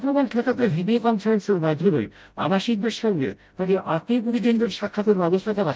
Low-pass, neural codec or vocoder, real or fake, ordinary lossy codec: none; codec, 16 kHz, 0.5 kbps, FreqCodec, smaller model; fake; none